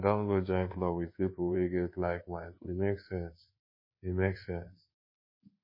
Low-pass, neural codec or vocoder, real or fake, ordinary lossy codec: 5.4 kHz; codec, 24 kHz, 1.2 kbps, DualCodec; fake; MP3, 24 kbps